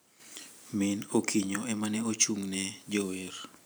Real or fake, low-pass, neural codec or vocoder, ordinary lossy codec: fake; none; vocoder, 44.1 kHz, 128 mel bands every 512 samples, BigVGAN v2; none